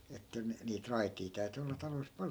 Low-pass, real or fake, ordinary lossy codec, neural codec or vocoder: none; real; none; none